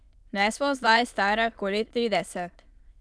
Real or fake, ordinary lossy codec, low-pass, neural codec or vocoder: fake; none; none; autoencoder, 22.05 kHz, a latent of 192 numbers a frame, VITS, trained on many speakers